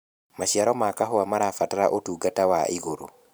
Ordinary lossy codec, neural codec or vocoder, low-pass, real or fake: none; vocoder, 44.1 kHz, 128 mel bands every 512 samples, BigVGAN v2; none; fake